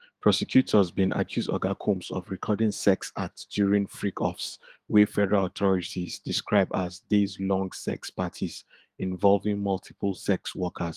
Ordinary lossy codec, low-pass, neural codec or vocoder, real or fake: Opus, 24 kbps; 14.4 kHz; autoencoder, 48 kHz, 128 numbers a frame, DAC-VAE, trained on Japanese speech; fake